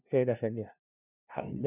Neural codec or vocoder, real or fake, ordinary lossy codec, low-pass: codec, 16 kHz, 0.5 kbps, FunCodec, trained on LibriTTS, 25 frames a second; fake; none; 3.6 kHz